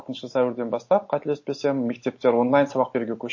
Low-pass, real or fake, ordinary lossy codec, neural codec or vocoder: 7.2 kHz; real; MP3, 32 kbps; none